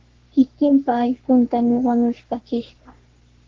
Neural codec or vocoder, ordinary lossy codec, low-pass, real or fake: codec, 44.1 kHz, 3.4 kbps, Pupu-Codec; Opus, 16 kbps; 7.2 kHz; fake